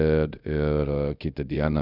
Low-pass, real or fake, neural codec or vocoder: 5.4 kHz; fake; codec, 24 kHz, 0.5 kbps, DualCodec